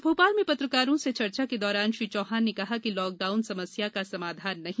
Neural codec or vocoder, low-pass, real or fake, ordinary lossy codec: none; none; real; none